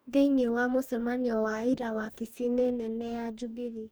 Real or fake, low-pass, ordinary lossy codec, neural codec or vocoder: fake; none; none; codec, 44.1 kHz, 2.6 kbps, DAC